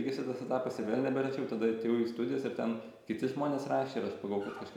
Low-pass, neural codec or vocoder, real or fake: 19.8 kHz; none; real